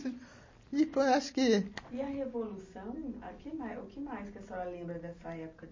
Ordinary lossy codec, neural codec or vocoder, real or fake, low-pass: MP3, 32 kbps; none; real; 7.2 kHz